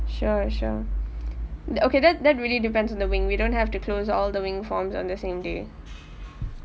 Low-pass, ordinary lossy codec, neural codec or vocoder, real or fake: none; none; none; real